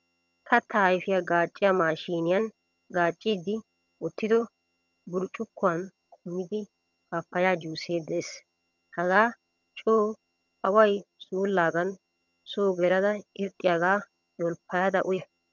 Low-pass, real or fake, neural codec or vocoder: 7.2 kHz; fake; vocoder, 22.05 kHz, 80 mel bands, HiFi-GAN